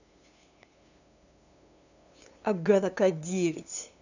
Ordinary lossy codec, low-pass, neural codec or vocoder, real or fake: none; 7.2 kHz; codec, 16 kHz, 2 kbps, FunCodec, trained on LibriTTS, 25 frames a second; fake